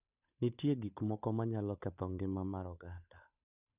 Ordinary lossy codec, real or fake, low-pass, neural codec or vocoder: none; fake; 3.6 kHz; codec, 16 kHz, 8 kbps, FunCodec, trained on Chinese and English, 25 frames a second